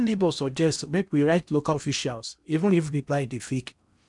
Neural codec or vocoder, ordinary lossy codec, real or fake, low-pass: codec, 16 kHz in and 24 kHz out, 0.8 kbps, FocalCodec, streaming, 65536 codes; none; fake; 10.8 kHz